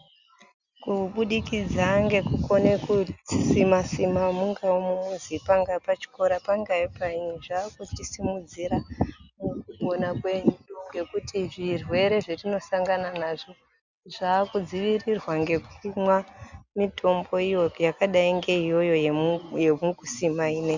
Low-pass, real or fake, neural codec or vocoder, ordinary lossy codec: 7.2 kHz; real; none; Opus, 64 kbps